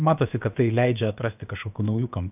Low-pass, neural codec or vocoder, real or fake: 3.6 kHz; codec, 16 kHz, about 1 kbps, DyCAST, with the encoder's durations; fake